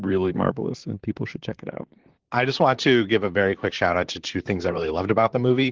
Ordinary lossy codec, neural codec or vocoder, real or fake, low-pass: Opus, 16 kbps; vocoder, 44.1 kHz, 128 mel bands, Pupu-Vocoder; fake; 7.2 kHz